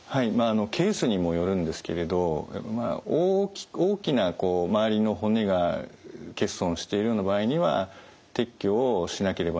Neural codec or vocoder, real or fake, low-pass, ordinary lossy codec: none; real; none; none